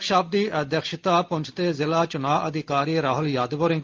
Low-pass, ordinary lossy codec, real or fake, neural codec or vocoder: 7.2 kHz; Opus, 32 kbps; real; none